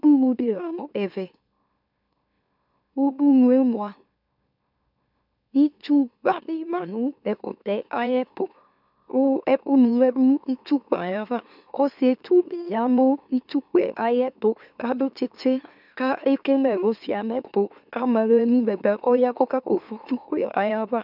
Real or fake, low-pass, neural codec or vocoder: fake; 5.4 kHz; autoencoder, 44.1 kHz, a latent of 192 numbers a frame, MeloTTS